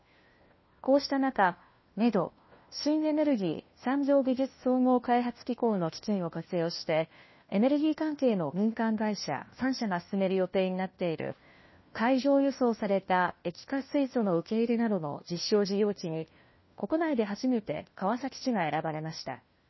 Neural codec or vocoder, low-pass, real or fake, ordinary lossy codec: codec, 16 kHz, 1 kbps, FunCodec, trained on LibriTTS, 50 frames a second; 7.2 kHz; fake; MP3, 24 kbps